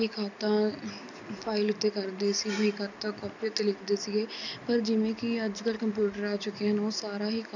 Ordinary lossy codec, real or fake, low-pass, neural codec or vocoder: none; real; 7.2 kHz; none